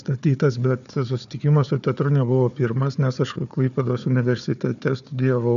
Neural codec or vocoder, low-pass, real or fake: codec, 16 kHz, 4 kbps, FunCodec, trained on Chinese and English, 50 frames a second; 7.2 kHz; fake